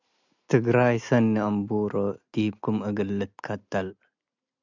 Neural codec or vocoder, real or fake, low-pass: none; real; 7.2 kHz